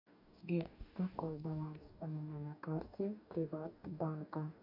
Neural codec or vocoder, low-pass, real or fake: codec, 44.1 kHz, 2.6 kbps, DAC; 5.4 kHz; fake